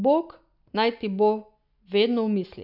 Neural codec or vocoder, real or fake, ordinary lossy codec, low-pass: none; real; none; 5.4 kHz